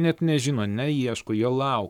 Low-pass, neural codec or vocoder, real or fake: 19.8 kHz; codec, 44.1 kHz, 7.8 kbps, Pupu-Codec; fake